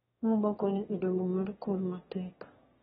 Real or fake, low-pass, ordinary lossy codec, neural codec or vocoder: fake; 9.9 kHz; AAC, 16 kbps; autoencoder, 22.05 kHz, a latent of 192 numbers a frame, VITS, trained on one speaker